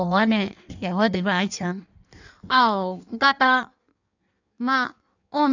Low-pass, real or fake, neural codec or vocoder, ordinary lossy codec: 7.2 kHz; fake; codec, 16 kHz in and 24 kHz out, 1.1 kbps, FireRedTTS-2 codec; none